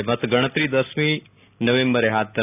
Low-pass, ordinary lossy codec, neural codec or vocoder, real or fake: 3.6 kHz; none; none; real